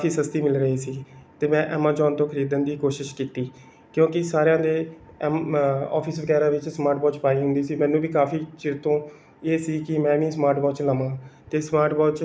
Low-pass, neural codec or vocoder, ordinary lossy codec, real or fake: none; none; none; real